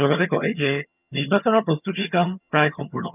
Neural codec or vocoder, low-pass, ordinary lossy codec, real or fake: vocoder, 22.05 kHz, 80 mel bands, HiFi-GAN; 3.6 kHz; none; fake